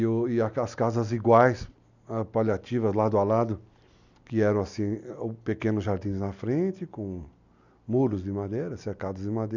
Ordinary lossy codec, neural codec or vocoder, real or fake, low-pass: none; none; real; 7.2 kHz